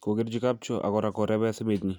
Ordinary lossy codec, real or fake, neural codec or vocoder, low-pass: none; real; none; 19.8 kHz